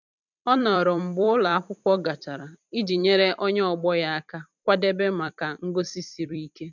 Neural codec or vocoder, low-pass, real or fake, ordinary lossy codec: vocoder, 44.1 kHz, 128 mel bands every 256 samples, BigVGAN v2; 7.2 kHz; fake; none